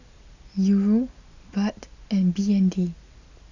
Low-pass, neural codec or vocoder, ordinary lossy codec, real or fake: 7.2 kHz; none; none; real